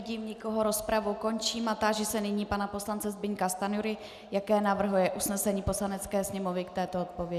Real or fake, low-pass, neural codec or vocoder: real; 14.4 kHz; none